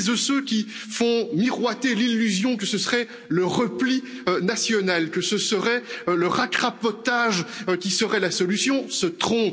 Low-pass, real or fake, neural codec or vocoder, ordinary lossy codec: none; real; none; none